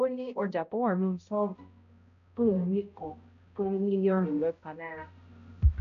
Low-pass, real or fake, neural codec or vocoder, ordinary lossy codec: 7.2 kHz; fake; codec, 16 kHz, 0.5 kbps, X-Codec, HuBERT features, trained on balanced general audio; none